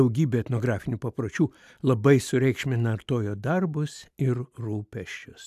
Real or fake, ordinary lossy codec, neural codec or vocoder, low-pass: real; AAC, 96 kbps; none; 14.4 kHz